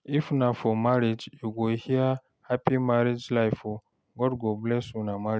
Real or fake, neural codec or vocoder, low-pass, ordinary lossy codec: real; none; none; none